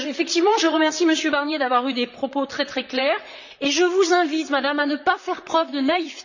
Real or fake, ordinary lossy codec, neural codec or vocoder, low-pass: fake; none; vocoder, 44.1 kHz, 128 mel bands, Pupu-Vocoder; 7.2 kHz